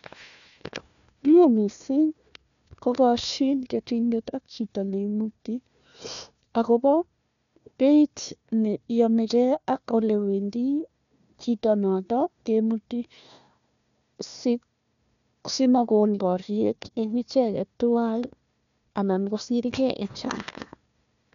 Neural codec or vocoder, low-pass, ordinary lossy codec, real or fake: codec, 16 kHz, 1 kbps, FunCodec, trained on Chinese and English, 50 frames a second; 7.2 kHz; none; fake